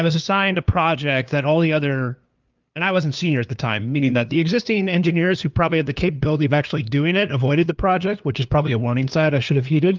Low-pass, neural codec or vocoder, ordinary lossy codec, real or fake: 7.2 kHz; codec, 16 kHz, 2 kbps, FunCodec, trained on LibriTTS, 25 frames a second; Opus, 24 kbps; fake